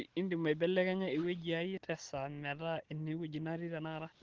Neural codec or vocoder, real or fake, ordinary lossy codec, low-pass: none; real; Opus, 16 kbps; 7.2 kHz